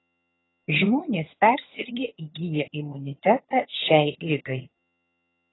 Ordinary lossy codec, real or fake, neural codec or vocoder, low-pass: AAC, 16 kbps; fake; vocoder, 22.05 kHz, 80 mel bands, HiFi-GAN; 7.2 kHz